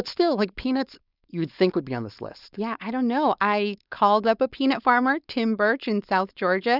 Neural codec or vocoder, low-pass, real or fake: codec, 16 kHz, 4.8 kbps, FACodec; 5.4 kHz; fake